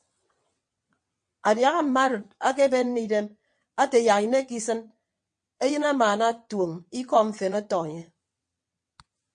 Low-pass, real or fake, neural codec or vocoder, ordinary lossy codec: 9.9 kHz; fake; vocoder, 22.05 kHz, 80 mel bands, WaveNeXt; MP3, 48 kbps